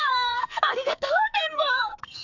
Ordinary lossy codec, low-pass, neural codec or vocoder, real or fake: none; 7.2 kHz; codec, 44.1 kHz, 2.6 kbps, SNAC; fake